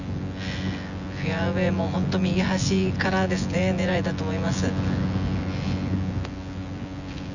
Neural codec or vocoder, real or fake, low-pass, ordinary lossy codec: vocoder, 24 kHz, 100 mel bands, Vocos; fake; 7.2 kHz; none